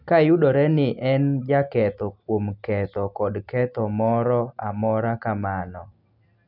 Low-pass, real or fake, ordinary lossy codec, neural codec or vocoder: 5.4 kHz; real; none; none